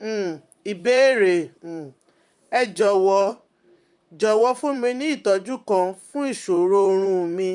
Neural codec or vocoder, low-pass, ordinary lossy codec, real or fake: vocoder, 44.1 kHz, 128 mel bands, Pupu-Vocoder; 10.8 kHz; none; fake